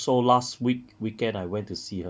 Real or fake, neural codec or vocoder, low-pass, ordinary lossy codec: real; none; none; none